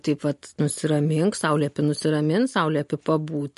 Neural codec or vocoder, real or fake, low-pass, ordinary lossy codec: none; real; 14.4 kHz; MP3, 48 kbps